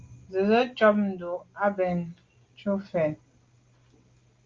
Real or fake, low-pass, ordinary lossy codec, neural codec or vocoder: real; 7.2 kHz; Opus, 32 kbps; none